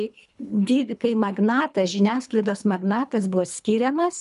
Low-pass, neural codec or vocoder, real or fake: 10.8 kHz; codec, 24 kHz, 3 kbps, HILCodec; fake